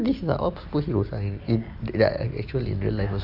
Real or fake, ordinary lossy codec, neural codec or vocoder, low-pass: real; AAC, 48 kbps; none; 5.4 kHz